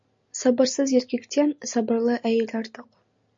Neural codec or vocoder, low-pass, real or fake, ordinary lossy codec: none; 7.2 kHz; real; MP3, 48 kbps